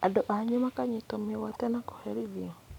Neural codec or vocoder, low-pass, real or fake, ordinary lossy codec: none; 19.8 kHz; real; none